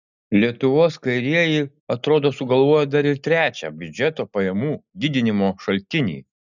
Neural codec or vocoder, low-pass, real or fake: none; 7.2 kHz; real